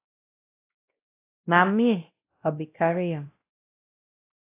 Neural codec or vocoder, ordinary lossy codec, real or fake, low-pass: codec, 16 kHz, 0.5 kbps, X-Codec, WavLM features, trained on Multilingual LibriSpeech; AAC, 24 kbps; fake; 3.6 kHz